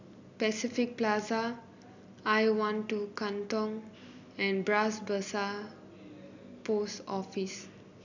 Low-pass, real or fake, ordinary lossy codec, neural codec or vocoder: 7.2 kHz; real; none; none